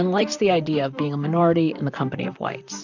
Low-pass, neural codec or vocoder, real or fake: 7.2 kHz; vocoder, 44.1 kHz, 128 mel bands, Pupu-Vocoder; fake